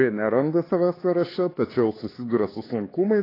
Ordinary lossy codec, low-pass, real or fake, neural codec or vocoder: AAC, 24 kbps; 5.4 kHz; fake; codec, 16 kHz, 4 kbps, X-Codec, HuBERT features, trained on LibriSpeech